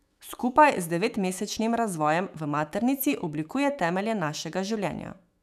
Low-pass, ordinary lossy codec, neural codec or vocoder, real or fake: 14.4 kHz; none; autoencoder, 48 kHz, 128 numbers a frame, DAC-VAE, trained on Japanese speech; fake